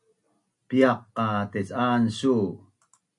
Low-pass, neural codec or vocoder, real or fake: 10.8 kHz; none; real